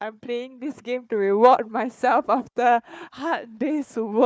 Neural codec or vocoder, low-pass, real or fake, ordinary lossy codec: codec, 16 kHz, 4 kbps, FunCodec, trained on Chinese and English, 50 frames a second; none; fake; none